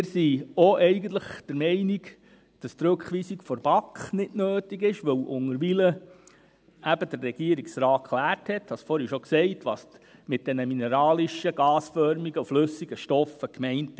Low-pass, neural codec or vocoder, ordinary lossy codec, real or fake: none; none; none; real